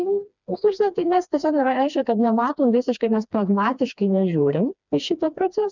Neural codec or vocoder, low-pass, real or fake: codec, 16 kHz, 2 kbps, FreqCodec, smaller model; 7.2 kHz; fake